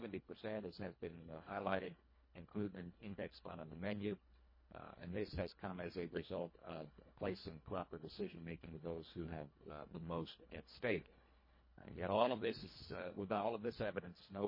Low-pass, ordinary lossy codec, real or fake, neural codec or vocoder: 5.4 kHz; MP3, 24 kbps; fake; codec, 24 kHz, 1.5 kbps, HILCodec